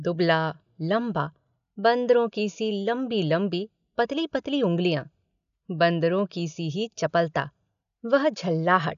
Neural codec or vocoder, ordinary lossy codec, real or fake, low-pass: none; none; real; 7.2 kHz